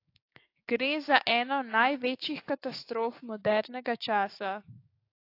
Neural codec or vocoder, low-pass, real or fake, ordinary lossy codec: codec, 16 kHz, 6 kbps, DAC; 5.4 kHz; fake; AAC, 32 kbps